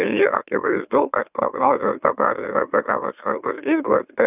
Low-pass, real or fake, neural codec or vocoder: 3.6 kHz; fake; autoencoder, 44.1 kHz, a latent of 192 numbers a frame, MeloTTS